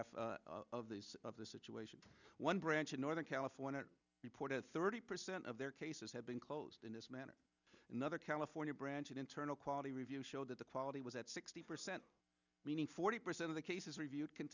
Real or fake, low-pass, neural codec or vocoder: real; 7.2 kHz; none